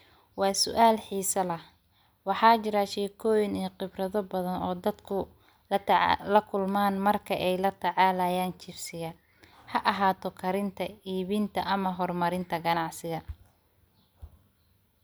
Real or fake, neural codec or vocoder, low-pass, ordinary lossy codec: real; none; none; none